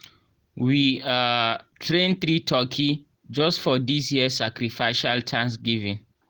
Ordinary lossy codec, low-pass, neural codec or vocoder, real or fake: Opus, 16 kbps; 19.8 kHz; none; real